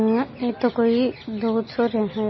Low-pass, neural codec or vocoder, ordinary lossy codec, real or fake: 7.2 kHz; none; MP3, 24 kbps; real